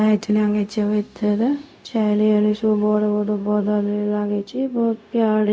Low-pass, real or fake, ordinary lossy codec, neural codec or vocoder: none; fake; none; codec, 16 kHz, 0.4 kbps, LongCat-Audio-Codec